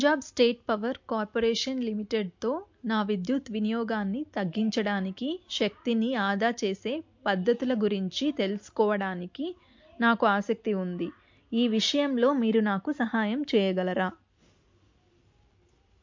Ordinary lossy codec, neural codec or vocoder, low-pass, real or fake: MP3, 48 kbps; none; 7.2 kHz; real